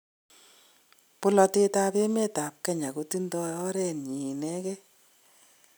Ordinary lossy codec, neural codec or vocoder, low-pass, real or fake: none; none; none; real